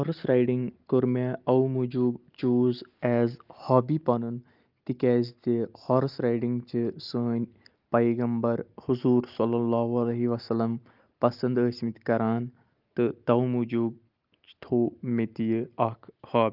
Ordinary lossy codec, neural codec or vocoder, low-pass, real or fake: Opus, 24 kbps; none; 5.4 kHz; real